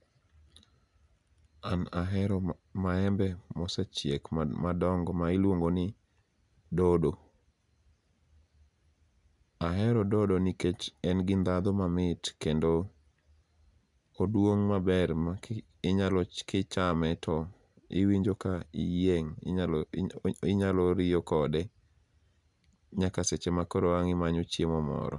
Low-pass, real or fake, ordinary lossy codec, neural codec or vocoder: 10.8 kHz; real; none; none